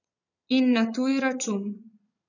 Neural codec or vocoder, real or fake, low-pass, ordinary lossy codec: vocoder, 22.05 kHz, 80 mel bands, Vocos; fake; 7.2 kHz; none